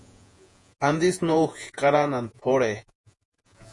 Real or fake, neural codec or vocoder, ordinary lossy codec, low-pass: fake; vocoder, 48 kHz, 128 mel bands, Vocos; MP3, 48 kbps; 10.8 kHz